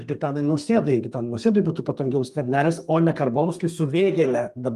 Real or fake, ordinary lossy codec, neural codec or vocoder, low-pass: fake; Opus, 32 kbps; codec, 32 kHz, 1.9 kbps, SNAC; 14.4 kHz